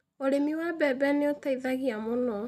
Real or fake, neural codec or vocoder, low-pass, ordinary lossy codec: real; none; 14.4 kHz; none